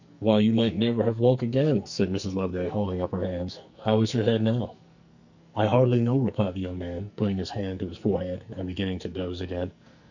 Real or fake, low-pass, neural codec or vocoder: fake; 7.2 kHz; codec, 44.1 kHz, 2.6 kbps, SNAC